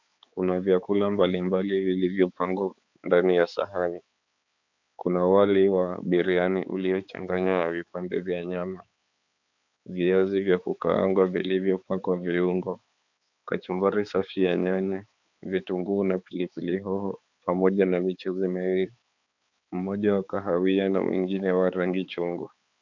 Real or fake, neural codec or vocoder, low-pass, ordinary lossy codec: fake; codec, 16 kHz, 4 kbps, X-Codec, HuBERT features, trained on balanced general audio; 7.2 kHz; MP3, 64 kbps